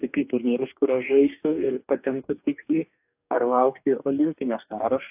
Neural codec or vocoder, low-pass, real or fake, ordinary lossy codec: codec, 44.1 kHz, 2.6 kbps, DAC; 3.6 kHz; fake; AAC, 32 kbps